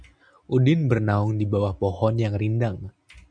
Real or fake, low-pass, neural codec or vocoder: real; 9.9 kHz; none